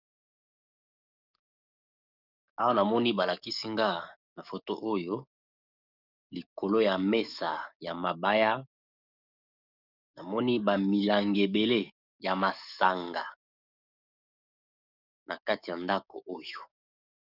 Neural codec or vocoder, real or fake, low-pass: codec, 44.1 kHz, 7.8 kbps, DAC; fake; 5.4 kHz